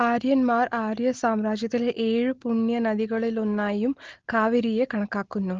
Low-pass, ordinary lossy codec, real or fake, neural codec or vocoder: 7.2 kHz; Opus, 16 kbps; real; none